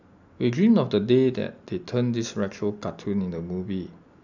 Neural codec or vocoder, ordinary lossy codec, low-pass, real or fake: none; none; 7.2 kHz; real